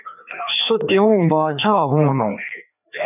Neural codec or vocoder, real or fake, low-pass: codec, 16 kHz, 2 kbps, FreqCodec, larger model; fake; 3.6 kHz